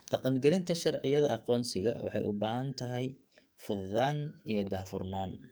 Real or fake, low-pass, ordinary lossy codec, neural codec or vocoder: fake; none; none; codec, 44.1 kHz, 2.6 kbps, SNAC